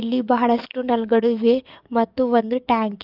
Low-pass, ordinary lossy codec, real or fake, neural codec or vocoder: 5.4 kHz; Opus, 24 kbps; fake; codec, 44.1 kHz, 7.8 kbps, DAC